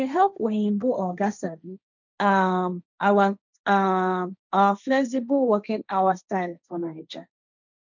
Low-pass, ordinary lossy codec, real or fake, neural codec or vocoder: 7.2 kHz; none; fake; codec, 16 kHz, 1.1 kbps, Voila-Tokenizer